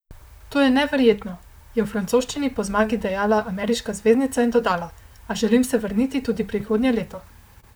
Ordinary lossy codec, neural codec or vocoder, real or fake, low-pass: none; vocoder, 44.1 kHz, 128 mel bands, Pupu-Vocoder; fake; none